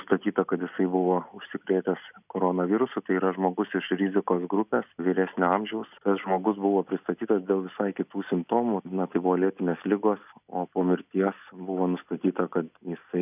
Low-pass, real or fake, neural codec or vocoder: 3.6 kHz; real; none